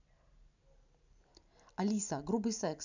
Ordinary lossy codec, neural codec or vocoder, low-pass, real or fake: none; none; 7.2 kHz; real